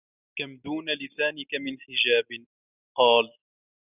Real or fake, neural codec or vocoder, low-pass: real; none; 3.6 kHz